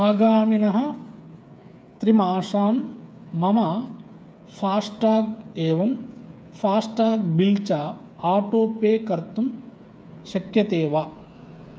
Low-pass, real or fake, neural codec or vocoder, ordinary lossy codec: none; fake; codec, 16 kHz, 8 kbps, FreqCodec, smaller model; none